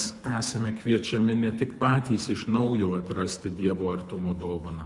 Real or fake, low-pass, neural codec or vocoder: fake; 10.8 kHz; codec, 24 kHz, 3 kbps, HILCodec